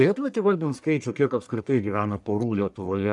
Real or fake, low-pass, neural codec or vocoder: fake; 10.8 kHz; codec, 44.1 kHz, 1.7 kbps, Pupu-Codec